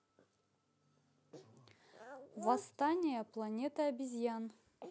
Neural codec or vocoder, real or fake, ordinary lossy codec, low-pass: none; real; none; none